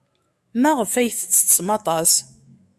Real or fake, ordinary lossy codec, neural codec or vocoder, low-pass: fake; AAC, 96 kbps; autoencoder, 48 kHz, 128 numbers a frame, DAC-VAE, trained on Japanese speech; 14.4 kHz